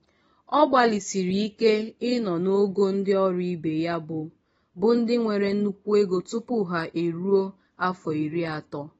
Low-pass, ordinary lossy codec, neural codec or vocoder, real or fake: 19.8 kHz; AAC, 24 kbps; none; real